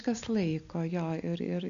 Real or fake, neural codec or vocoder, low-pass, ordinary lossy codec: real; none; 7.2 kHz; AAC, 96 kbps